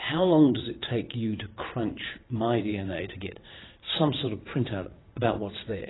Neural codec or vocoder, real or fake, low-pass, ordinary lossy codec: vocoder, 22.05 kHz, 80 mel bands, WaveNeXt; fake; 7.2 kHz; AAC, 16 kbps